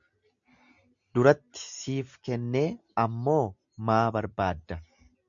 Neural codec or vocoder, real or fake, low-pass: none; real; 7.2 kHz